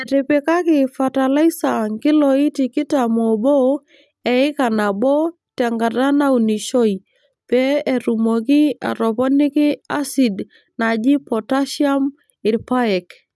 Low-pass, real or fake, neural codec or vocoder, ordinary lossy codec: none; real; none; none